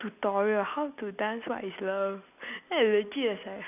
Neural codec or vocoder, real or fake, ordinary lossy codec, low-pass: none; real; none; 3.6 kHz